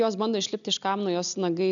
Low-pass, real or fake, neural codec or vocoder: 7.2 kHz; real; none